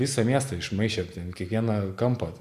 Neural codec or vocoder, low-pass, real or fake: none; 14.4 kHz; real